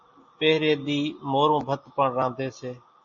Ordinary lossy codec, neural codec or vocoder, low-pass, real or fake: MP3, 32 kbps; none; 7.2 kHz; real